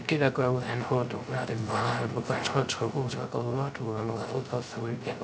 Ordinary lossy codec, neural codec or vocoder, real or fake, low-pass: none; codec, 16 kHz, 0.3 kbps, FocalCodec; fake; none